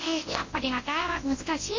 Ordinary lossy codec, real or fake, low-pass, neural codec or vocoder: MP3, 32 kbps; fake; 7.2 kHz; codec, 24 kHz, 0.9 kbps, WavTokenizer, large speech release